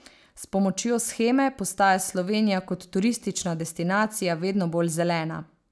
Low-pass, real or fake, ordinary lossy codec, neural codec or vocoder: none; real; none; none